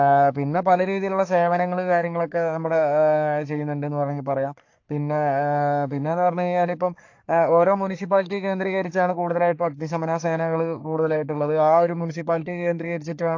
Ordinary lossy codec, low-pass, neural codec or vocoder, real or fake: AAC, 48 kbps; 7.2 kHz; codec, 44.1 kHz, 3.4 kbps, Pupu-Codec; fake